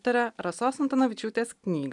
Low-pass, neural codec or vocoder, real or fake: 10.8 kHz; none; real